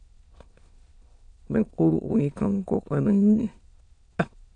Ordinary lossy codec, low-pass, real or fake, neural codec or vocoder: AAC, 64 kbps; 9.9 kHz; fake; autoencoder, 22.05 kHz, a latent of 192 numbers a frame, VITS, trained on many speakers